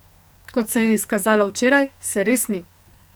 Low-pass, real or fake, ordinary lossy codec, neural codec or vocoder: none; fake; none; codec, 44.1 kHz, 2.6 kbps, SNAC